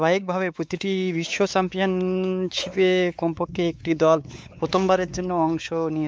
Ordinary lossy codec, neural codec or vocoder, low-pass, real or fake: none; codec, 16 kHz, 4 kbps, X-Codec, WavLM features, trained on Multilingual LibriSpeech; none; fake